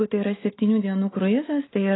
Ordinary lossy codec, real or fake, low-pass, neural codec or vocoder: AAC, 16 kbps; real; 7.2 kHz; none